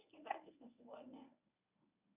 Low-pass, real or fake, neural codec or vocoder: 3.6 kHz; fake; codec, 24 kHz, 0.9 kbps, WavTokenizer, medium speech release version 1